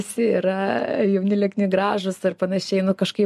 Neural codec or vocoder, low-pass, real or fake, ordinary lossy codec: none; 14.4 kHz; real; MP3, 64 kbps